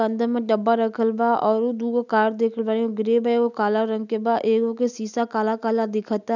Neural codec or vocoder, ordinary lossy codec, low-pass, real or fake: none; none; 7.2 kHz; real